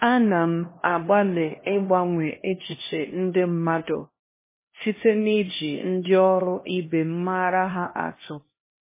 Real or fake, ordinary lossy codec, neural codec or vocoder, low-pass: fake; MP3, 16 kbps; codec, 16 kHz, 1 kbps, X-Codec, HuBERT features, trained on LibriSpeech; 3.6 kHz